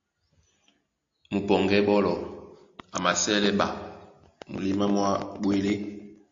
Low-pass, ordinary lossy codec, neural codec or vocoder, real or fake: 7.2 kHz; AAC, 64 kbps; none; real